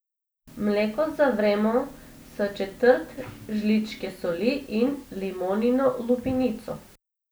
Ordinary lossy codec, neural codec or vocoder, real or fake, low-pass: none; none; real; none